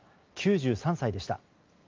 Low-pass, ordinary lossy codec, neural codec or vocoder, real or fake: 7.2 kHz; Opus, 32 kbps; none; real